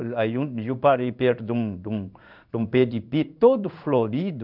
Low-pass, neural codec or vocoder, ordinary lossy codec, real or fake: 5.4 kHz; codec, 16 kHz in and 24 kHz out, 1 kbps, XY-Tokenizer; none; fake